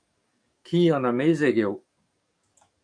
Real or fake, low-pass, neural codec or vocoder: fake; 9.9 kHz; codec, 44.1 kHz, 7.8 kbps, DAC